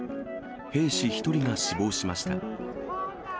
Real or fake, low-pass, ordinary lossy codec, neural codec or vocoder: real; none; none; none